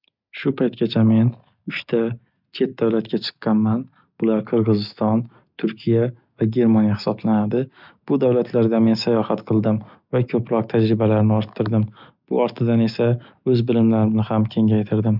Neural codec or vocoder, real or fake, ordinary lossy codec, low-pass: none; real; none; 5.4 kHz